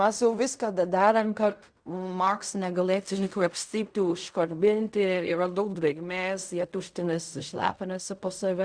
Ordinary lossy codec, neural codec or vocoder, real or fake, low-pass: Opus, 64 kbps; codec, 16 kHz in and 24 kHz out, 0.4 kbps, LongCat-Audio-Codec, fine tuned four codebook decoder; fake; 9.9 kHz